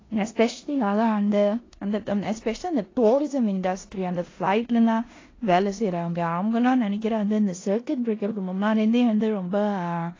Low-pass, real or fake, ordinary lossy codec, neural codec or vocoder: 7.2 kHz; fake; AAC, 32 kbps; codec, 16 kHz in and 24 kHz out, 0.9 kbps, LongCat-Audio-Codec, four codebook decoder